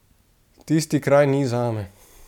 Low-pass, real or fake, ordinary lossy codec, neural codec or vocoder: 19.8 kHz; real; none; none